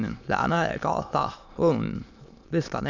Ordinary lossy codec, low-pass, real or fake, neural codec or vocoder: none; 7.2 kHz; fake; autoencoder, 22.05 kHz, a latent of 192 numbers a frame, VITS, trained on many speakers